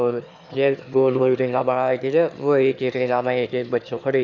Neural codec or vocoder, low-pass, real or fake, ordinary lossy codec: autoencoder, 22.05 kHz, a latent of 192 numbers a frame, VITS, trained on one speaker; 7.2 kHz; fake; none